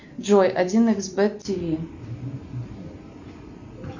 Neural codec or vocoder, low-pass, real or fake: none; 7.2 kHz; real